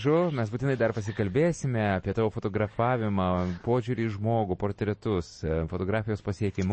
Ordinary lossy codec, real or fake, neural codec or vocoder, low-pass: MP3, 32 kbps; real; none; 9.9 kHz